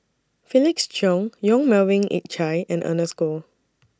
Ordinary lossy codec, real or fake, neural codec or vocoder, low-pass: none; real; none; none